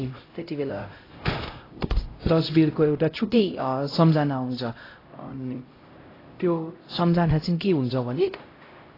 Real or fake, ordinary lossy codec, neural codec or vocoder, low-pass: fake; AAC, 24 kbps; codec, 16 kHz, 0.5 kbps, X-Codec, HuBERT features, trained on LibriSpeech; 5.4 kHz